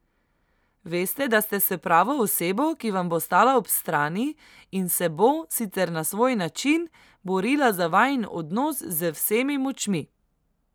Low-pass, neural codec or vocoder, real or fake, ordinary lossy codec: none; none; real; none